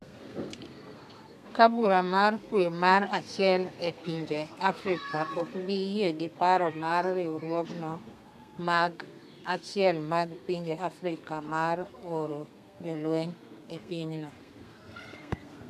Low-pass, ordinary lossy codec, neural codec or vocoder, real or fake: 14.4 kHz; none; codec, 32 kHz, 1.9 kbps, SNAC; fake